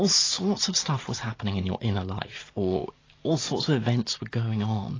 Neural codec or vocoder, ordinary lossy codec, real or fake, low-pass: none; AAC, 32 kbps; real; 7.2 kHz